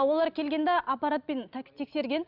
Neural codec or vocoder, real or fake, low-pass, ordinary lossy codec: none; real; 5.4 kHz; none